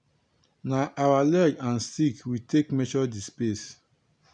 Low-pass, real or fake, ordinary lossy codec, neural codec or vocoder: 9.9 kHz; real; none; none